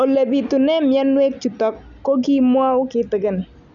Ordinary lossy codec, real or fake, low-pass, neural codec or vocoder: none; real; 7.2 kHz; none